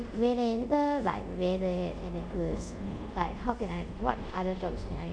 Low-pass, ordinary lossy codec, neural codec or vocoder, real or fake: 9.9 kHz; none; codec, 24 kHz, 0.5 kbps, DualCodec; fake